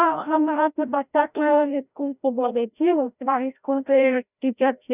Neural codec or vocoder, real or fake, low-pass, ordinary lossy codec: codec, 16 kHz, 0.5 kbps, FreqCodec, larger model; fake; 3.6 kHz; none